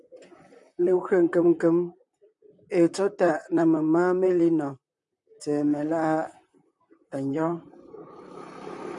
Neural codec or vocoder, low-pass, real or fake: vocoder, 44.1 kHz, 128 mel bands, Pupu-Vocoder; 10.8 kHz; fake